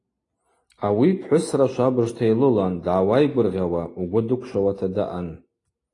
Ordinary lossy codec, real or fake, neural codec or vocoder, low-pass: AAC, 32 kbps; real; none; 10.8 kHz